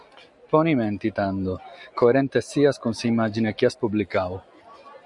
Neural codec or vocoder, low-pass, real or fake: none; 10.8 kHz; real